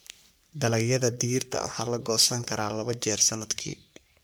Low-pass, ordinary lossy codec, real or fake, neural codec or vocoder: none; none; fake; codec, 44.1 kHz, 3.4 kbps, Pupu-Codec